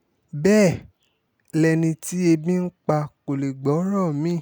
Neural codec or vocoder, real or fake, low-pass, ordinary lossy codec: none; real; none; none